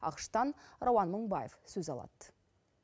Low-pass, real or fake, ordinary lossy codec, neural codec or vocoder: none; real; none; none